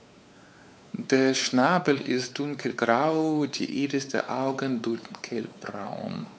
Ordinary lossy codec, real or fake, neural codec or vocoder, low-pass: none; fake; codec, 16 kHz, 4 kbps, X-Codec, WavLM features, trained on Multilingual LibriSpeech; none